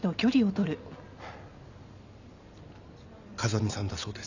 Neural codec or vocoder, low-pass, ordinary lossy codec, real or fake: none; 7.2 kHz; none; real